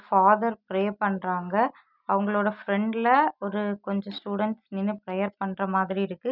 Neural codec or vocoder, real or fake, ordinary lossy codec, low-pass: none; real; none; 5.4 kHz